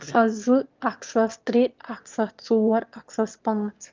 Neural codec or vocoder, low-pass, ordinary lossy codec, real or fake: autoencoder, 22.05 kHz, a latent of 192 numbers a frame, VITS, trained on one speaker; 7.2 kHz; Opus, 24 kbps; fake